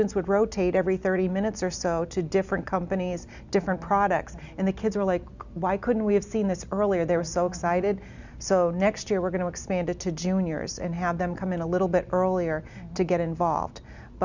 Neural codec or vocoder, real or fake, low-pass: none; real; 7.2 kHz